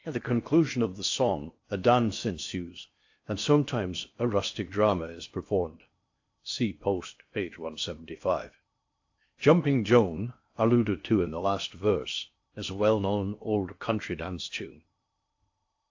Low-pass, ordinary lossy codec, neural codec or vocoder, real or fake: 7.2 kHz; AAC, 48 kbps; codec, 16 kHz in and 24 kHz out, 0.6 kbps, FocalCodec, streaming, 4096 codes; fake